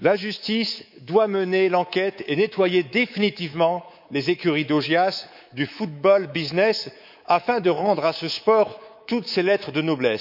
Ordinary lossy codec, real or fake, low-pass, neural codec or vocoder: none; fake; 5.4 kHz; codec, 24 kHz, 3.1 kbps, DualCodec